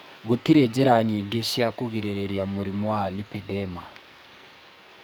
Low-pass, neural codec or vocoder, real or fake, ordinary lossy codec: none; codec, 44.1 kHz, 2.6 kbps, SNAC; fake; none